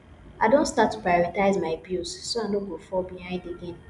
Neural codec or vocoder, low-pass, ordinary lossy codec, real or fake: none; 10.8 kHz; none; real